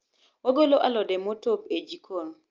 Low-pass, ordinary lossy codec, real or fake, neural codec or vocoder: 7.2 kHz; Opus, 24 kbps; real; none